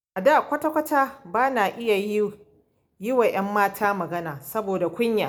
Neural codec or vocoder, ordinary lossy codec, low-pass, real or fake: none; none; none; real